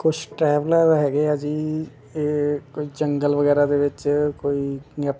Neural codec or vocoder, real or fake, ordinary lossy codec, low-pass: none; real; none; none